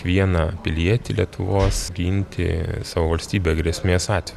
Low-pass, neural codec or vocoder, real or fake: 14.4 kHz; none; real